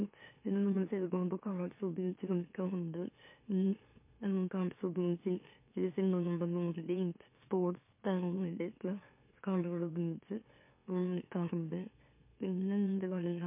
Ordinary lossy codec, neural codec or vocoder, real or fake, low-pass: MP3, 24 kbps; autoencoder, 44.1 kHz, a latent of 192 numbers a frame, MeloTTS; fake; 3.6 kHz